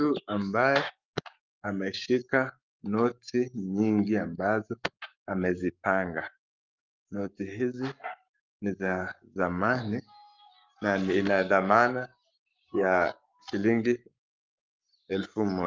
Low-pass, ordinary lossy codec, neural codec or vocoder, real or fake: 7.2 kHz; Opus, 24 kbps; codec, 44.1 kHz, 7.8 kbps, Pupu-Codec; fake